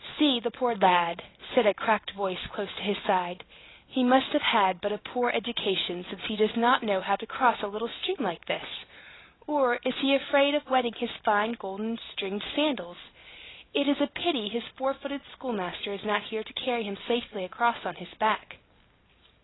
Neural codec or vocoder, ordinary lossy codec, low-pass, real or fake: none; AAC, 16 kbps; 7.2 kHz; real